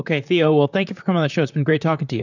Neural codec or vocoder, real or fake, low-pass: vocoder, 44.1 kHz, 128 mel bands, Pupu-Vocoder; fake; 7.2 kHz